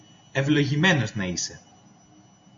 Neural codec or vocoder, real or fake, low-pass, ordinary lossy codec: none; real; 7.2 kHz; MP3, 48 kbps